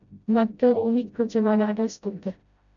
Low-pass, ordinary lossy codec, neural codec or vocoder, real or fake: 7.2 kHz; MP3, 96 kbps; codec, 16 kHz, 0.5 kbps, FreqCodec, smaller model; fake